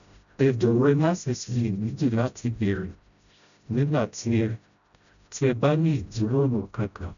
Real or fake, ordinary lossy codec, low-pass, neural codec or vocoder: fake; none; 7.2 kHz; codec, 16 kHz, 0.5 kbps, FreqCodec, smaller model